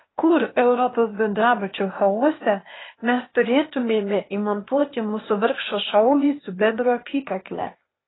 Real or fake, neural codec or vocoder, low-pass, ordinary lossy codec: fake; codec, 16 kHz, 0.8 kbps, ZipCodec; 7.2 kHz; AAC, 16 kbps